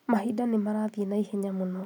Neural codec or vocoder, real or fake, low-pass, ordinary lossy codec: none; real; 19.8 kHz; none